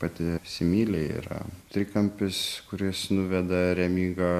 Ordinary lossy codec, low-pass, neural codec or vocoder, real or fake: MP3, 64 kbps; 14.4 kHz; none; real